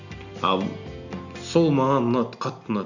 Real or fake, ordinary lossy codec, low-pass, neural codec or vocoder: real; none; 7.2 kHz; none